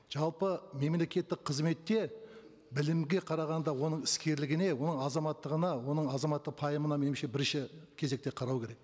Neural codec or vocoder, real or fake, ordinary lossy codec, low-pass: none; real; none; none